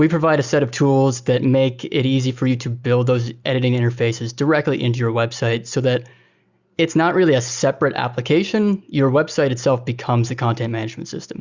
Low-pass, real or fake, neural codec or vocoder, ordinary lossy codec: 7.2 kHz; real; none; Opus, 64 kbps